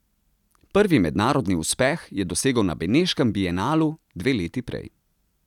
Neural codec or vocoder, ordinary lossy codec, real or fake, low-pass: none; none; real; 19.8 kHz